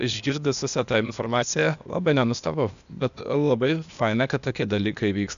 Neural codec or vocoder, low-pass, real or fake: codec, 16 kHz, 0.8 kbps, ZipCodec; 7.2 kHz; fake